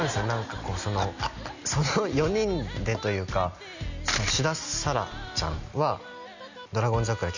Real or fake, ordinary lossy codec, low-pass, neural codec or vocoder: real; none; 7.2 kHz; none